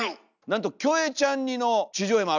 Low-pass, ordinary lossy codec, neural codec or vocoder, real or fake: 7.2 kHz; none; none; real